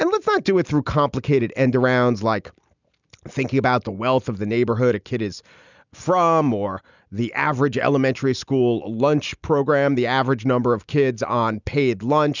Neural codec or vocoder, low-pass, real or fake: none; 7.2 kHz; real